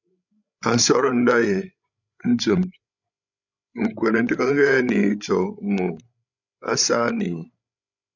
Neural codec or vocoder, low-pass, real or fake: codec, 16 kHz, 16 kbps, FreqCodec, larger model; 7.2 kHz; fake